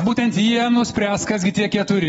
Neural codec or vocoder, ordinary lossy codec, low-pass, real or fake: none; AAC, 24 kbps; 19.8 kHz; real